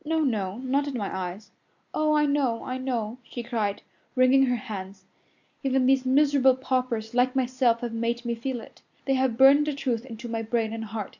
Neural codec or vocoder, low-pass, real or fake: none; 7.2 kHz; real